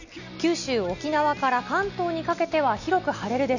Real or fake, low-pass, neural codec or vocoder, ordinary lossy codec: real; 7.2 kHz; none; none